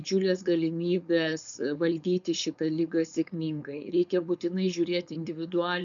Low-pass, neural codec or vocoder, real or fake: 7.2 kHz; codec, 16 kHz, 4.8 kbps, FACodec; fake